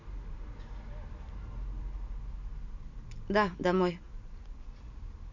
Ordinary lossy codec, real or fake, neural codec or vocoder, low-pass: none; real; none; 7.2 kHz